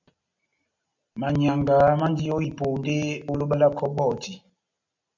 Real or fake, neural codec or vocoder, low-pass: real; none; 7.2 kHz